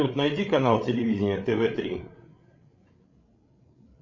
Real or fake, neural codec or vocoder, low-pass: fake; codec, 16 kHz, 16 kbps, FreqCodec, larger model; 7.2 kHz